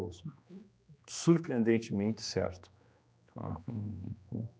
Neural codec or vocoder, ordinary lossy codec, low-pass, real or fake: codec, 16 kHz, 2 kbps, X-Codec, HuBERT features, trained on general audio; none; none; fake